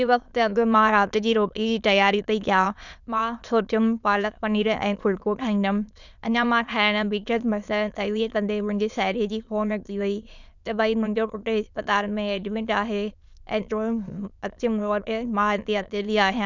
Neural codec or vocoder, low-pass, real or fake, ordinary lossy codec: autoencoder, 22.05 kHz, a latent of 192 numbers a frame, VITS, trained on many speakers; 7.2 kHz; fake; none